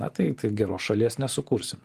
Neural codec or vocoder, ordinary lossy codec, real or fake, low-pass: vocoder, 44.1 kHz, 128 mel bands every 512 samples, BigVGAN v2; Opus, 24 kbps; fake; 14.4 kHz